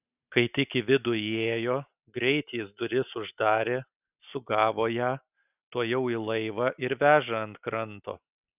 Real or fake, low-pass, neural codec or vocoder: real; 3.6 kHz; none